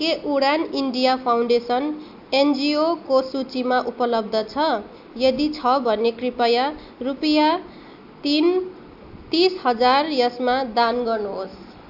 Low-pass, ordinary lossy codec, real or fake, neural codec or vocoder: 5.4 kHz; AAC, 48 kbps; real; none